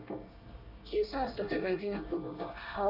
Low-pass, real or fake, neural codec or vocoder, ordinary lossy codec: 5.4 kHz; fake; codec, 24 kHz, 1 kbps, SNAC; none